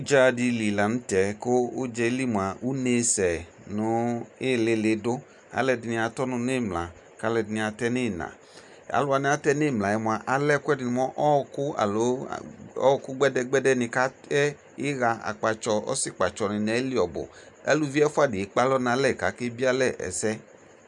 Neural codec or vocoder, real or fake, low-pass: none; real; 10.8 kHz